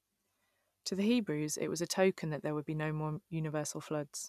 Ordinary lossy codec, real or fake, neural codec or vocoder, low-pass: none; real; none; 14.4 kHz